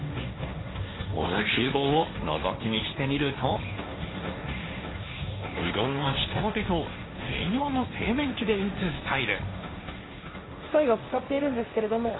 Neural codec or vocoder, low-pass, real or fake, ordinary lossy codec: codec, 16 kHz, 1.1 kbps, Voila-Tokenizer; 7.2 kHz; fake; AAC, 16 kbps